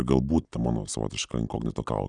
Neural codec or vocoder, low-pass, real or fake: none; 9.9 kHz; real